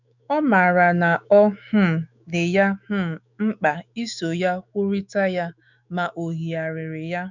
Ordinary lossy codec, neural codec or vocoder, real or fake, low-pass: none; autoencoder, 48 kHz, 128 numbers a frame, DAC-VAE, trained on Japanese speech; fake; 7.2 kHz